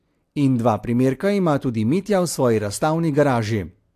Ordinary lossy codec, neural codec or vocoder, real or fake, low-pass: AAC, 64 kbps; none; real; 14.4 kHz